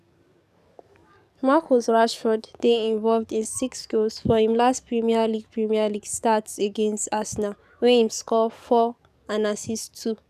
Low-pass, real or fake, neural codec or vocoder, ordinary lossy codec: 14.4 kHz; fake; codec, 44.1 kHz, 7.8 kbps, DAC; none